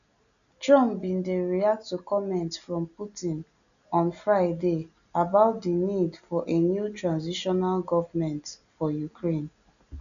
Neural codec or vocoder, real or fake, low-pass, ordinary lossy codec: none; real; 7.2 kHz; none